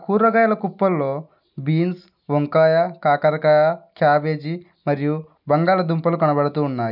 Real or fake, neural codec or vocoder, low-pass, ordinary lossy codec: real; none; 5.4 kHz; none